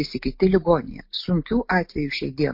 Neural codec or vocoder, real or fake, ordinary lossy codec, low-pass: none; real; MP3, 32 kbps; 5.4 kHz